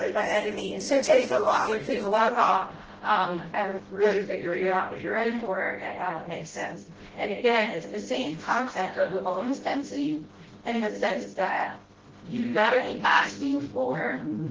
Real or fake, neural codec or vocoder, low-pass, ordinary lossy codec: fake; codec, 16 kHz, 0.5 kbps, FreqCodec, smaller model; 7.2 kHz; Opus, 16 kbps